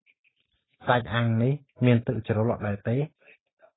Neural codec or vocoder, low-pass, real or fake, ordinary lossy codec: none; 7.2 kHz; real; AAC, 16 kbps